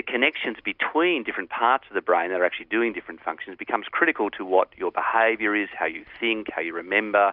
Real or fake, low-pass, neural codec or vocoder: real; 5.4 kHz; none